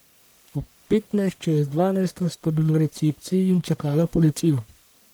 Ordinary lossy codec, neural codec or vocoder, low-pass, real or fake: none; codec, 44.1 kHz, 1.7 kbps, Pupu-Codec; none; fake